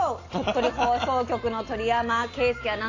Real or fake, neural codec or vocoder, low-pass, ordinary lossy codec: real; none; 7.2 kHz; AAC, 32 kbps